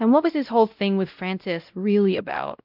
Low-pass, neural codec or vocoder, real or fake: 5.4 kHz; codec, 16 kHz, 0.5 kbps, X-Codec, WavLM features, trained on Multilingual LibriSpeech; fake